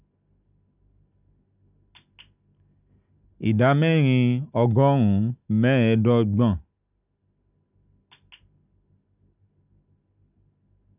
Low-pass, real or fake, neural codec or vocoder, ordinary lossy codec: 3.6 kHz; real; none; none